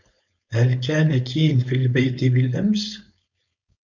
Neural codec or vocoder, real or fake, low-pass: codec, 16 kHz, 4.8 kbps, FACodec; fake; 7.2 kHz